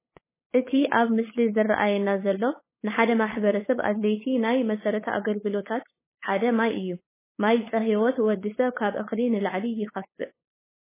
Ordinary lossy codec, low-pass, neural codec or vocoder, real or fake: MP3, 16 kbps; 3.6 kHz; codec, 16 kHz, 8 kbps, FunCodec, trained on LibriTTS, 25 frames a second; fake